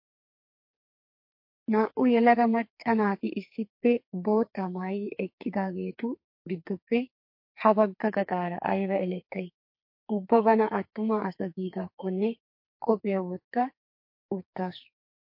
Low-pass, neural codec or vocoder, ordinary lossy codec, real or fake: 5.4 kHz; codec, 44.1 kHz, 2.6 kbps, SNAC; MP3, 32 kbps; fake